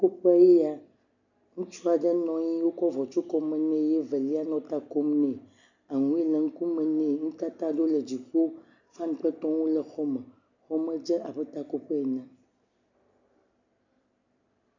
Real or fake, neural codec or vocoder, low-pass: real; none; 7.2 kHz